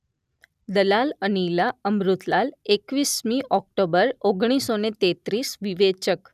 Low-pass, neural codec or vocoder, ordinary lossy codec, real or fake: 14.4 kHz; none; none; real